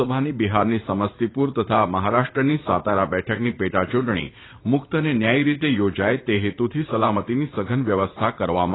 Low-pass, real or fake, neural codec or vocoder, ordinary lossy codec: 7.2 kHz; fake; vocoder, 44.1 kHz, 80 mel bands, Vocos; AAC, 16 kbps